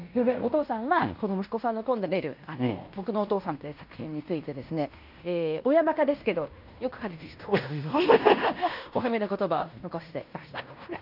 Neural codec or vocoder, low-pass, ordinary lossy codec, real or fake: codec, 16 kHz in and 24 kHz out, 0.9 kbps, LongCat-Audio-Codec, fine tuned four codebook decoder; 5.4 kHz; none; fake